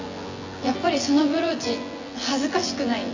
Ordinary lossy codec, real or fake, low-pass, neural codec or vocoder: AAC, 48 kbps; fake; 7.2 kHz; vocoder, 24 kHz, 100 mel bands, Vocos